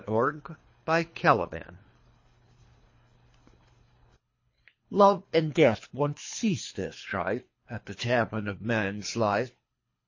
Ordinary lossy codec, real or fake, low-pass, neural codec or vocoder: MP3, 32 kbps; fake; 7.2 kHz; codec, 24 kHz, 3 kbps, HILCodec